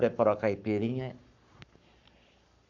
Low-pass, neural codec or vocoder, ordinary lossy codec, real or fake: 7.2 kHz; codec, 44.1 kHz, 7.8 kbps, Pupu-Codec; none; fake